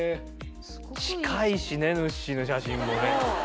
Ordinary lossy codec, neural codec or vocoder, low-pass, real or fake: none; none; none; real